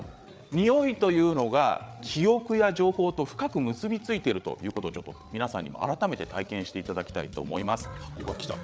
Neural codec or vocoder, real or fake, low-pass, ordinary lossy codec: codec, 16 kHz, 8 kbps, FreqCodec, larger model; fake; none; none